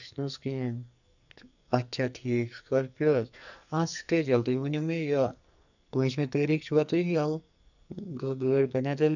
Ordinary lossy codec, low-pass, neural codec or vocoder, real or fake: none; 7.2 kHz; codec, 44.1 kHz, 2.6 kbps, SNAC; fake